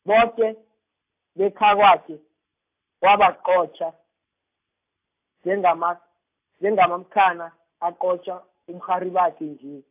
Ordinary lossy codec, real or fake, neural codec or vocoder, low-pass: AAC, 32 kbps; real; none; 3.6 kHz